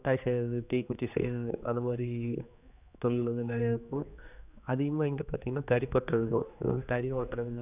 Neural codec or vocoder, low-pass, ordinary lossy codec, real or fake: codec, 16 kHz, 2 kbps, X-Codec, HuBERT features, trained on general audio; 3.6 kHz; none; fake